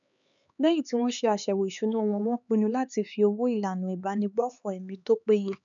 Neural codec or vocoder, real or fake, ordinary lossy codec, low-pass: codec, 16 kHz, 4 kbps, X-Codec, HuBERT features, trained on LibriSpeech; fake; none; 7.2 kHz